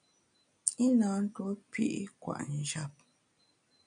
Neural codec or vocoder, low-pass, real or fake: none; 9.9 kHz; real